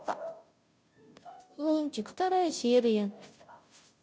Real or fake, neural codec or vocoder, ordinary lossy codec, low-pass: fake; codec, 16 kHz, 0.5 kbps, FunCodec, trained on Chinese and English, 25 frames a second; none; none